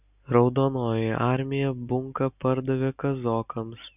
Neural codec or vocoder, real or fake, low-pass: none; real; 3.6 kHz